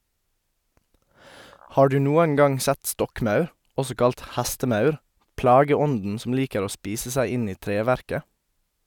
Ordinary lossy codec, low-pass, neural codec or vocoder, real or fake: none; 19.8 kHz; none; real